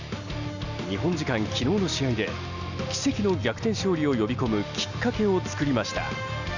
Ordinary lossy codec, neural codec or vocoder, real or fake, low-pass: none; none; real; 7.2 kHz